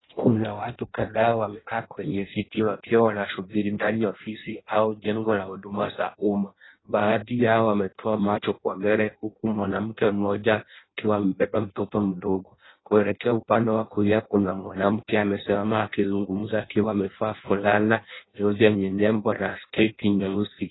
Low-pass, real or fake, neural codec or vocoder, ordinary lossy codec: 7.2 kHz; fake; codec, 16 kHz in and 24 kHz out, 0.6 kbps, FireRedTTS-2 codec; AAC, 16 kbps